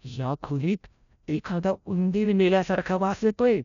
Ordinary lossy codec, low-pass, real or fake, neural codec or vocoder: none; 7.2 kHz; fake; codec, 16 kHz, 0.5 kbps, FreqCodec, larger model